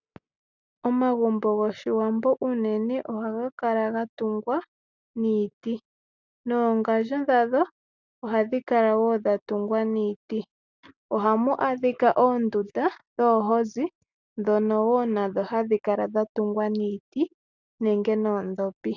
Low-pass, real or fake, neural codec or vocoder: 7.2 kHz; real; none